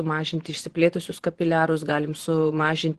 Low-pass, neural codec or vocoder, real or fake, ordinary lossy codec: 10.8 kHz; vocoder, 24 kHz, 100 mel bands, Vocos; fake; Opus, 16 kbps